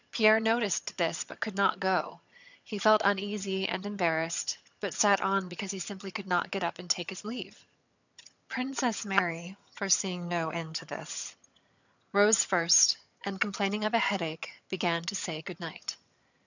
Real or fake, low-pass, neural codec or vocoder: fake; 7.2 kHz; vocoder, 22.05 kHz, 80 mel bands, HiFi-GAN